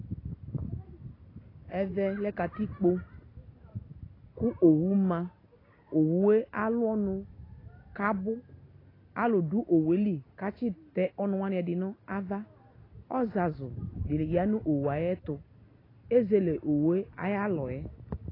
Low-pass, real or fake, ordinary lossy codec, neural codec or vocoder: 5.4 kHz; real; AAC, 24 kbps; none